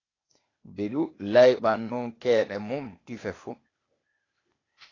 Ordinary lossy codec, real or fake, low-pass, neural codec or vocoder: AAC, 32 kbps; fake; 7.2 kHz; codec, 16 kHz, 0.8 kbps, ZipCodec